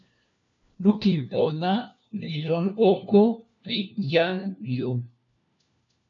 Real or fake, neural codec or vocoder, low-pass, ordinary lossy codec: fake; codec, 16 kHz, 1 kbps, FunCodec, trained on LibriTTS, 50 frames a second; 7.2 kHz; MP3, 64 kbps